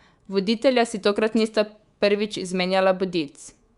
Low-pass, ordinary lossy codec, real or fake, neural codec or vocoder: 10.8 kHz; Opus, 64 kbps; fake; codec, 24 kHz, 3.1 kbps, DualCodec